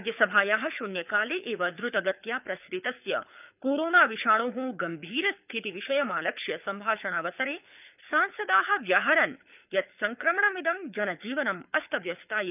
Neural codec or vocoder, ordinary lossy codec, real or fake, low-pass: codec, 24 kHz, 6 kbps, HILCodec; none; fake; 3.6 kHz